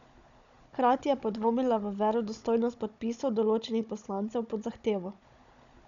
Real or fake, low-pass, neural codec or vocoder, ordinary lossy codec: fake; 7.2 kHz; codec, 16 kHz, 16 kbps, FunCodec, trained on Chinese and English, 50 frames a second; MP3, 96 kbps